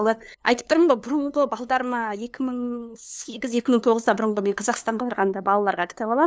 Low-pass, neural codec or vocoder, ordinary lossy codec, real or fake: none; codec, 16 kHz, 2 kbps, FunCodec, trained on LibriTTS, 25 frames a second; none; fake